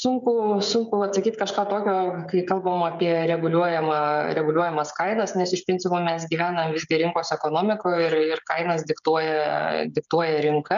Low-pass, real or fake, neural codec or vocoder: 7.2 kHz; fake; codec, 16 kHz, 16 kbps, FreqCodec, smaller model